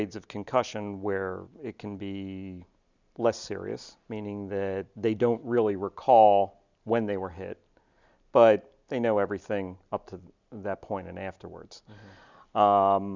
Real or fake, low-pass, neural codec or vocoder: real; 7.2 kHz; none